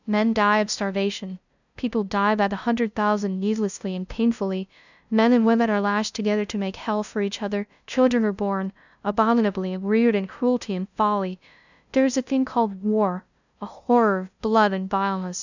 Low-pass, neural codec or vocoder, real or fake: 7.2 kHz; codec, 16 kHz, 0.5 kbps, FunCodec, trained on LibriTTS, 25 frames a second; fake